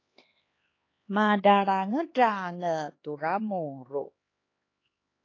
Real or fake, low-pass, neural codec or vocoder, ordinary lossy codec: fake; 7.2 kHz; codec, 16 kHz, 2 kbps, X-Codec, HuBERT features, trained on LibriSpeech; AAC, 32 kbps